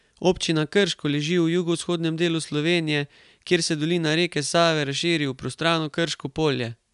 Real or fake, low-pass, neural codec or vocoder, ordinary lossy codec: real; 10.8 kHz; none; none